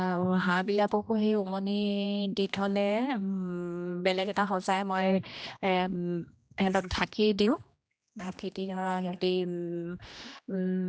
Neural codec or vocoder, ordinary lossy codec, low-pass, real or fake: codec, 16 kHz, 1 kbps, X-Codec, HuBERT features, trained on general audio; none; none; fake